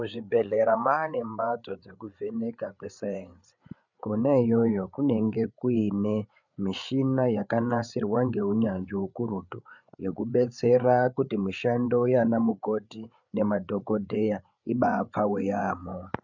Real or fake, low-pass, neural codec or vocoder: fake; 7.2 kHz; codec, 16 kHz, 8 kbps, FreqCodec, larger model